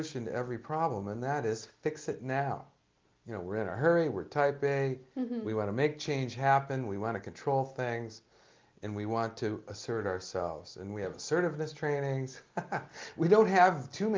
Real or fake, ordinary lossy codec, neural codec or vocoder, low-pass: real; Opus, 32 kbps; none; 7.2 kHz